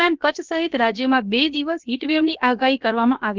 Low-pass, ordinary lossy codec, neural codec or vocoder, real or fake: 7.2 kHz; Opus, 32 kbps; codec, 16 kHz, about 1 kbps, DyCAST, with the encoder's durations; fake